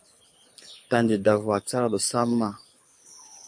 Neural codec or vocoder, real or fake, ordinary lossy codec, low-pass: codec, 24 kHz, 6 kbps, HILCodec; fake; MP3, 48 kbps; 9.9 kHz